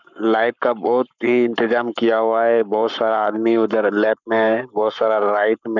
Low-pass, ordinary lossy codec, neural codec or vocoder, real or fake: 7.2 kHz; none; codec, 44.1 kHz, 7.8 kbps, Pupu-Codec; fake